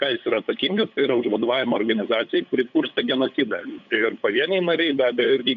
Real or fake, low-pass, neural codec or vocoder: fake; 7.2 kHz; codec, 16 kHz, 8 kbps, FunCodec, trained on LibriTTS, 25 frames a second